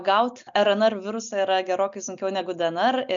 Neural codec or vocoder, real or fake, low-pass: none; real; 7.2 kHz